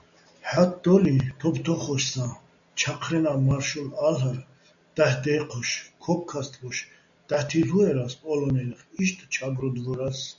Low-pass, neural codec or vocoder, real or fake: 7.2 kHz; none; real